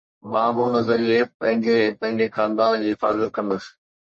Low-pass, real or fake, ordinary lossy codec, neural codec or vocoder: 10.8 kHz; fake; MP3, 32 kbps; codec, 44.1 kHz, 1.7 kbps, Pupu-Codec